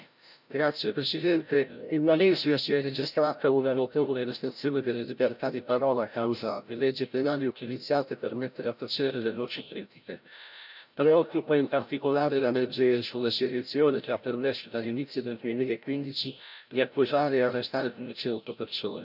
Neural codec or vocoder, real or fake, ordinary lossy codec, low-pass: codec, 16 kHz, 0.5 kbps, FreqCodec, larger model; fake; none; 5.4 kHz